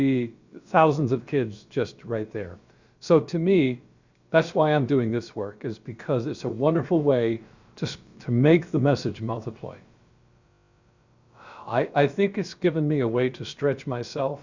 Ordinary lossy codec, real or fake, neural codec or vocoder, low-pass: Opus, 64 kbps; fake; codec, 16 kHz, about 1 kbps, DyCAST, with the encoder's durations; 7.2 kHz